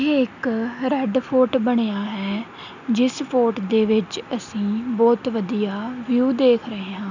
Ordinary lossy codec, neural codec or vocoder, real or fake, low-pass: none; none; real; 7.2 kHz